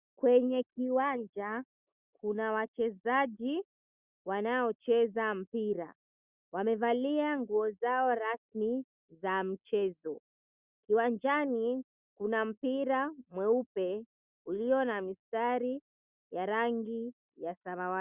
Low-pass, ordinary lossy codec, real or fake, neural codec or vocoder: 3.6 kHz; Opus, 64 kbps; real; none